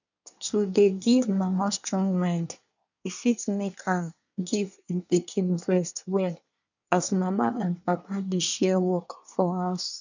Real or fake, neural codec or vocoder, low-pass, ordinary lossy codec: fake; codec, 24 kHz, 1 kbps, SNAC; 7.2 kHz; none